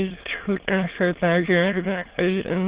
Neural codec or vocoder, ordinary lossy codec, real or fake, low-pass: autoencoder, 22.05 kHz, a latent of 192 numbers a frame, VITS, trained on many speakers; Opus, 16 kbps; fake; 3.6 kHz